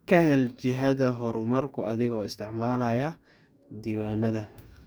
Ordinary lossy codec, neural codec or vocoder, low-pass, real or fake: none; codec, 44.1 kHz, 2.6 kbps, DAC; none; fake